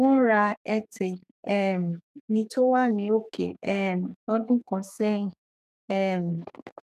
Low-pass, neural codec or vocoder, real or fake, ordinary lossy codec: 14.4 kHz; codec, 32 kHz, 1.9 kbps, SNAC; fake; none